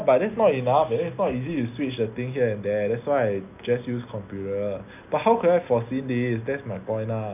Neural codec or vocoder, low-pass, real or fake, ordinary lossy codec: none; 3.6 kHz; real; none